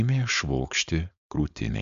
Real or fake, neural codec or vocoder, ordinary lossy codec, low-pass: fake; codec, 16 kHz, 4.8 kbps, FACodec; AAC, 96 kbps; 7.2 kHz